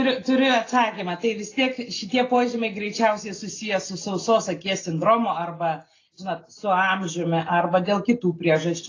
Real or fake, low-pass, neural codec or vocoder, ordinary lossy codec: real; 7.2 kHz; none; AAC, 32 kbps